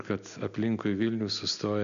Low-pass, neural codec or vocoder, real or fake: 7.2 kHz; none; real